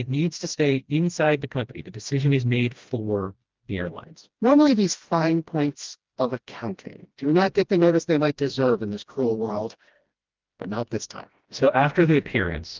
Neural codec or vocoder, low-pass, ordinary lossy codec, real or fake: codec, 16 kHz, 1 kbps, FreqCodec, smaller model; 7.2 kHz; Opus, 32 kbps; fake